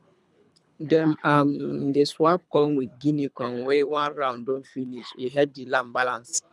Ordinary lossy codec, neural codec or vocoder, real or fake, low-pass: none; codec, 24 kHz, 3 kbps, HILCodec; fake; none